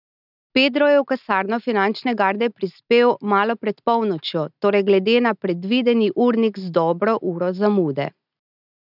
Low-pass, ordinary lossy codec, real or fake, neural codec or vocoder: 5.4 kHz; none; real; none